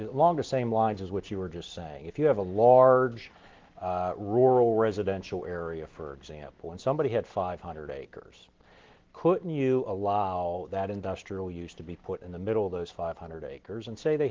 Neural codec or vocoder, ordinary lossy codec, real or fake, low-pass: none; Opus, 16 kbps; real; 7.2 kHz